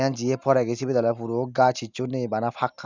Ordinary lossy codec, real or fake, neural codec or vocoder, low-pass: none; real; none; 7.2 kHz